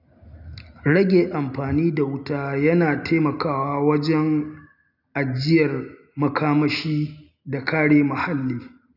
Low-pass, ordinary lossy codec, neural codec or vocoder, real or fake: 5.4 kHz; none; none; real